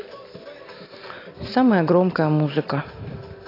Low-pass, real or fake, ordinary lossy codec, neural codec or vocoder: 5.4 kHz; real; AAC, 24 kbps; none